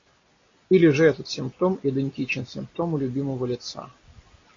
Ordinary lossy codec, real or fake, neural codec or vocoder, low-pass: AAC, 32 kbps; real; none; 7.2 kHz